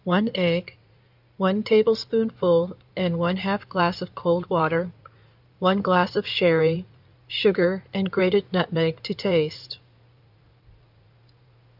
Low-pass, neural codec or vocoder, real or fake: 5.4 kHz; codec, 16 kHz in and 24 kHz out, 2.2 kbps, FireRedTTS-2 codec; fake